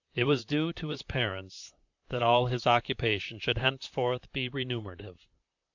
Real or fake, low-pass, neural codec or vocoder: fake; 7.2 kHz; vocoder, 44.1 kHz, 128 mel bands, Pupu-Vocoder